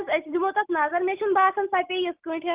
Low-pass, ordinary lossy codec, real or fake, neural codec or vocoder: 3.6 kHz; Opus, 24 kbps; real; none